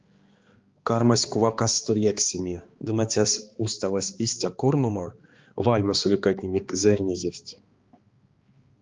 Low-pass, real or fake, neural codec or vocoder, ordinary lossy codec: 7.2 kHz; fake; codec, 16 kHz, 2 kbps, X-Codec, HuBERT features, trained on balanced general audio; Opus, 32 kbps